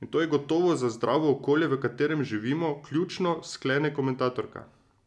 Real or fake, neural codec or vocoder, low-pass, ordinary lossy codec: real; none; none; none